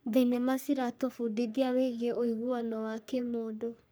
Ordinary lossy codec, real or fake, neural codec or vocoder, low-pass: none; fake; codec, 44.1 kHz, 3.4 kbps, Pupu-Codec; none